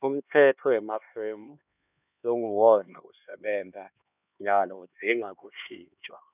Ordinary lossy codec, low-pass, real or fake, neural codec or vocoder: none; 3.6 kHz; fake; codec, 16 kHz, 2 kbps, X-Codec, HuBERT features, trained on LibriSpeech